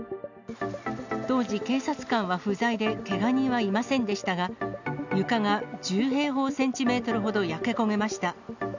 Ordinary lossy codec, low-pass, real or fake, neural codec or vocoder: none; 7.2 kHz; real; none